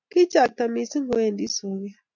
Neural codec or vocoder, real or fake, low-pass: none; real; 7.2 kHz